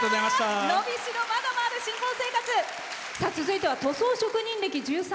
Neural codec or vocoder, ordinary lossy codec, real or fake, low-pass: none; none; real; none